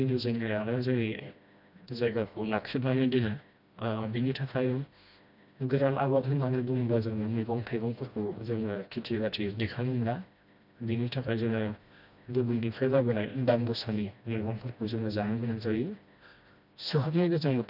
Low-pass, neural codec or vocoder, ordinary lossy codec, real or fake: 5.4 kHz; codec, 16 kHz, 1 kbps, FreqCodec, smaller model; none; fake